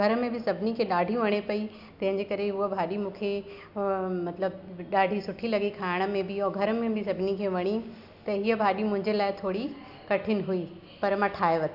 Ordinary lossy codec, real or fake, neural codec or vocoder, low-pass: AAC, 48 kbps; real; none; 5.4 kHz